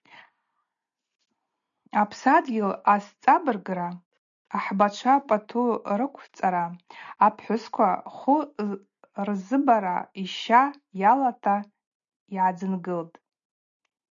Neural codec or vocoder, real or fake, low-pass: none; real; 7.2 kHz